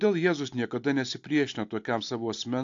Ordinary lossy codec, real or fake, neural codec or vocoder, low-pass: AAC, 48 kbps; real; none; 7.2 kHz